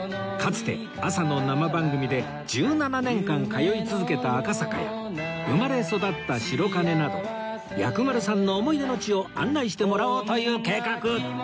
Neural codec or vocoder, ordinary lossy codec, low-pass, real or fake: none; none; none; real